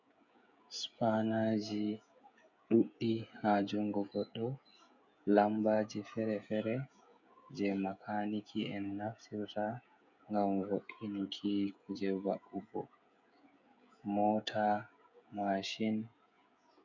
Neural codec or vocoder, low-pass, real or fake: codec, 16 kHz, 16 kbps, FreqCodec, smaller model; 7.2 kHz; fake